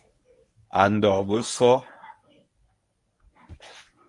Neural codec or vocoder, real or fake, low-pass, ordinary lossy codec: codec, 24 kHz, 0.9 kbps, WavTokenizer, medium speech release version 1; fake; 10.8 kHz; MP3, 64 kbps